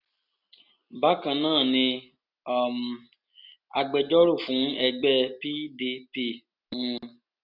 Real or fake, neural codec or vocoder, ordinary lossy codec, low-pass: real; none; none; 5.4 kHz